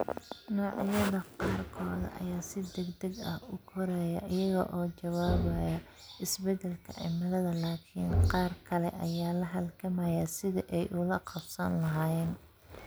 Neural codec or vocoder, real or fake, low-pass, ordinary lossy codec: none; real; none; none